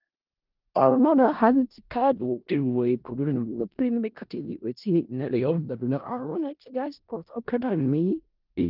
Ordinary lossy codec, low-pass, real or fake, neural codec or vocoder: Opus, 32 kbps; 5.4 kHz; fake; codec, 16 kHz in and 24 kHz out, 0.4 kbps, LongCat-Audio-Codec, four codebook decoder